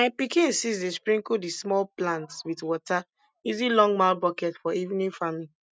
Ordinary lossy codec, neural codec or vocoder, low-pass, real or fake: none; none; none; real